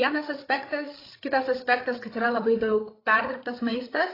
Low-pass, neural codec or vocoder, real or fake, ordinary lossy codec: 5.4 kHz; codec, 16 kHz, 8 kbps, FreqCodec, larger model; fake; AAC, 24 kbps